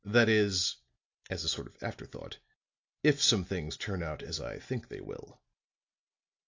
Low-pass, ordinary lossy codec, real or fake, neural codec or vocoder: 7.2 kHz; AAC, 48 kbps; real; none